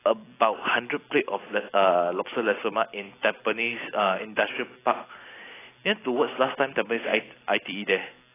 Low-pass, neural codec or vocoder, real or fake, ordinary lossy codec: 3.6 kHz; none; real; AAC, 16 kbps